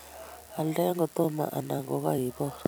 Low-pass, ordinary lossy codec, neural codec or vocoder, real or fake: none; none; vocoder, 44.1 kHz, 128 mel bands every 256 samples, BigVGAN v2; fake